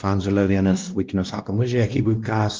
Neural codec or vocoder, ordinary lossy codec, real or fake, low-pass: codec, 16 kHz, 1 kbps, X-Codec, WavLM features, trained on Multilingual LibriSpeech; Opus, 32 kbps; fake; 7.2 kHz